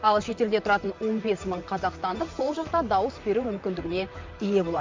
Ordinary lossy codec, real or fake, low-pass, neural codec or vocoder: MP3, 64 kbps; fake; 7.2 kHz; vocoder, 44.1 kHz, 128 mel bands, Pupu-Vocoder